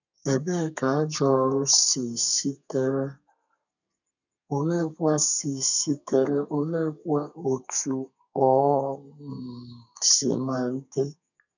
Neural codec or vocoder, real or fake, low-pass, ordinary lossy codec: codec, 32 kHz, 1.9 kbps, SNAC; fake; 7.2 kHz; none